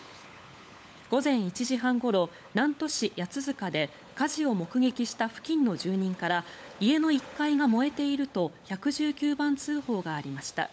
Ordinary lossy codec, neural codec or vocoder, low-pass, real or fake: none; codec, 16 kHz, 16 kbps, FunCodec, trained on LibriTTS, 50 frames a second; none; fake